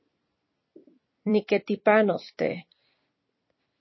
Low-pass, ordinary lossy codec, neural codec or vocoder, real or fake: 7.2 kHz; MP3, 24 kbps; vocoder, 44.1 kHz, 128 mel bands every 256 samples, BigVGAN v2; fake